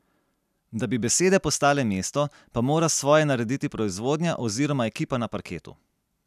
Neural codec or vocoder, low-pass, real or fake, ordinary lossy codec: none; 14.4 kHz; real; none